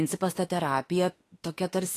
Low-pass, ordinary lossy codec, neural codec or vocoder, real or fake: 14.4 kHz; AAC, 48 kbps; autoencoder, 48 kHz, 32 numbers a frame, DAC-VAE, trained on Japanese speech; fake